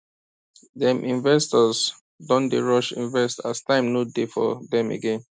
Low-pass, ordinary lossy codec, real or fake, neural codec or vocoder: none; none; real; none